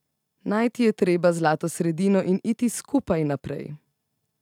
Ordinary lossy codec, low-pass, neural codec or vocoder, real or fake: none; 19.8 kHz; none; real